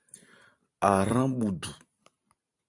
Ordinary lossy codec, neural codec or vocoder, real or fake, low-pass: AAC, 64 kbps; vocoder, 44.1 kHz, 128 mel bands every 512 samples, BigVGAN v2; fake; 10.8 kHz